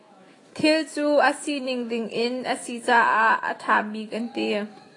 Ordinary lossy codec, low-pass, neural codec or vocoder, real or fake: AAC, 32 kbps; 10.8 kHz; autoencoder, 48 kHz, 128 numbers a frame, DAC-VAE, trained on Japanese speech; fake